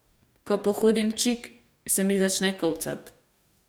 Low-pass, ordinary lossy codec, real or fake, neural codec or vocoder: none; none; fake; codec, 44.1 kHz, 2.6 kbps, DAC